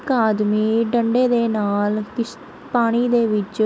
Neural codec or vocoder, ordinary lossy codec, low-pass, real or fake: none; none; none; real